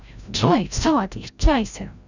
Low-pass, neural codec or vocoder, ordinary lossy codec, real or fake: 7.2 kHz; codec, 16 kHz, 0.5 kbps, FreqCodec, larger model; none; fake